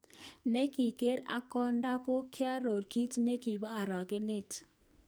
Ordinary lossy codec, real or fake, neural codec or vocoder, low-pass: none; fake; codec, 44.1 kHz, 2.6 kbps, SNAC; none